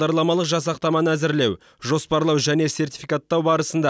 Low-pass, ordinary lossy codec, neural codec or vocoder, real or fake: none; none; none; real